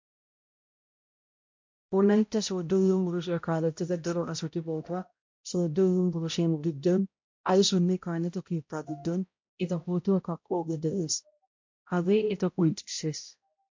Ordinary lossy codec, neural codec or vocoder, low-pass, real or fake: MP3, 48 kbps; codec, 16 kHz, 0.5 kbps, X-Codec, HuBERT features, trained on balanced general audio; 7.2 kHz; fake